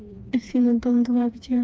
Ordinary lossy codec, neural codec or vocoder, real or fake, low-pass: none; codec, 16 kHz, 2 kbps, FreqCodec, smaller model; fake; none